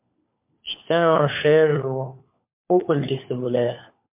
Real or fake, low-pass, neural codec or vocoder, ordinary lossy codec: fake; 3.6 kHz; codec, 16 kHz, 4 kbps, FunCodec, trained on LibriTTS, 50 frames a second; MP3, 32 kbps